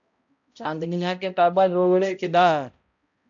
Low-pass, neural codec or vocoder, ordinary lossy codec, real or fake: 7.2 kHz; codec, 16 kHz, 0.5 kbps, X-Codec, HuBERT features, trained on balanced general audio; AAC, 64 kbps; fake